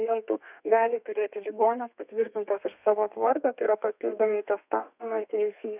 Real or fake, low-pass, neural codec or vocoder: fake; 3.6 kHz; codec, 32 kHz, 1.9 kbps, SNAC